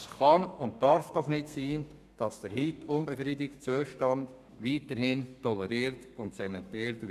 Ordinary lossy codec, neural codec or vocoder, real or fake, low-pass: none; codec, 32 kHz, 1.9 kbps, SNAC; fake; 14.4 kHz